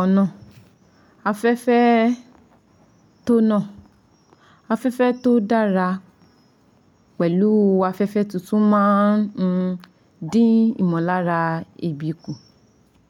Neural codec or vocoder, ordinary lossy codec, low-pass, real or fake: none; MP3, 96 kbps; 19.8 kHz; real